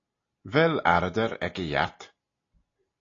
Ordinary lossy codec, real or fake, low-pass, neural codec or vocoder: AAC, 32 kbps; real; 7.2 kHz; none